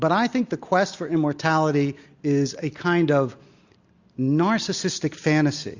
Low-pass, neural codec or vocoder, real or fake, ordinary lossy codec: 7.2 kHz; none; real; Opus, 64 kbps